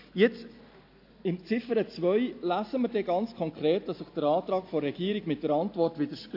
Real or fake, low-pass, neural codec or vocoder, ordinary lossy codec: real; 5.4 kHz; none; AAC, 32 kbps